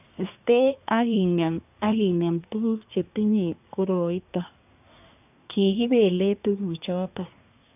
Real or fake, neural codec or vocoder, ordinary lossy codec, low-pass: fake; codec, 24 kHz, 1 kbps, SNAC; none; 3.6 kHz